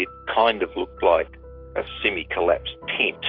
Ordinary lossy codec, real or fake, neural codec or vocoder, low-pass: AAC, 32 kbps; real; none; 5.4 kHz